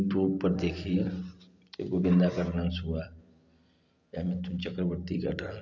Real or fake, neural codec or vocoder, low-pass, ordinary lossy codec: real; none; 7.2 kHz; none